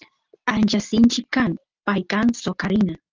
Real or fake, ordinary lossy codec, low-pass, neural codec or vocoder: real; Opus, 24 kbps; 7.2 kHz; none